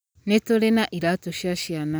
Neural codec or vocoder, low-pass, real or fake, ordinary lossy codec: none; none; real; none